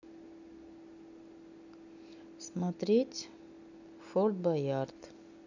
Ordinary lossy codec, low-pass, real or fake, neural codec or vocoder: none; 7.2 kHz; real; none